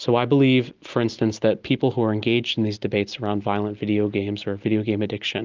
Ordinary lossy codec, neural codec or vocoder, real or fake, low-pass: Opus, 32 kbps; none; real; 7.2 kHz